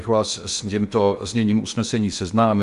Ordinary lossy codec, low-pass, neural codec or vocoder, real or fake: Opus, 64 kbps; 10.8 kHz; codec, 16 kHz in and 24 kHz out, 0.8 kbps, FocalCodec, streaming, 65536 codes; fake